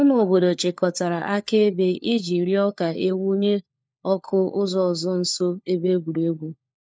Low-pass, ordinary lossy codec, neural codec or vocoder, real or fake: none; none; codec, 16 kHz, 4 kbps, FunCodec, trained on LibriTTS, 50 frames a second; fake